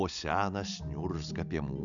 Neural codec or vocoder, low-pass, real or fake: none; 7.2 kHz; real